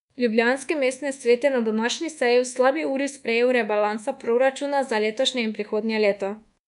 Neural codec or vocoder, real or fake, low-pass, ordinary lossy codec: codec, 24 kHz, 1.2 kbps, DualCodec; fake; 10.8 kHz; none